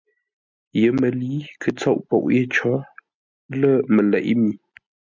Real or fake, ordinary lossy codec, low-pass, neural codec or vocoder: real; MP3, 64 kbps; 7.2 kHz; none